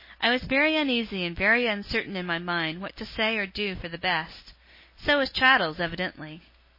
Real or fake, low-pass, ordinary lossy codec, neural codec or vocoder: real; 5.4 kHz; MP3, 24 kbps; none